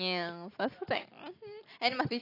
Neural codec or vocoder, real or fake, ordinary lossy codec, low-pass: none; real; AAC, 32 kbps; 5.4 kHz